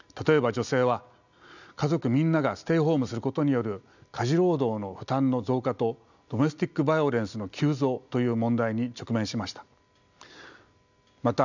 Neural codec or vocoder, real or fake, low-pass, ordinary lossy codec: none; real; 7.2 kHz; none